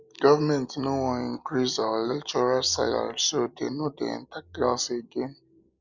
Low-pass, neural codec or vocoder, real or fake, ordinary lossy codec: 7.2 kHz; none; real; none